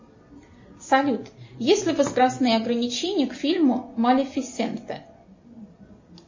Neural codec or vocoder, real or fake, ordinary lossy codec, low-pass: none; real; MP3, 32 kbps; 7.2 kHz